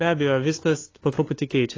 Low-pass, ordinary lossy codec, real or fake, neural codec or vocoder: 7.2 kHz; AAC, 32 kbps; fake; codec, 16 kHz, 2 kbps, FunCodec, trained on LibriTTS, 25 frames a second